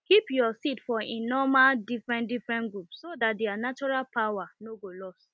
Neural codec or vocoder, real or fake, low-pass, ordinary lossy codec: none; real; none; none